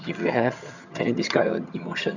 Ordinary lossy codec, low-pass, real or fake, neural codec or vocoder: none; 7.2 kHz; fake; vocoder, 22.05 kHz, 80 mel bands, HiFi-GAN